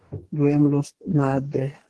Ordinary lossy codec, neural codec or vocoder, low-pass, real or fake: Opus, 16 kbps; codec, 44.1 kHz, 2.6 kbps, DAC; 10.8 kHz; fake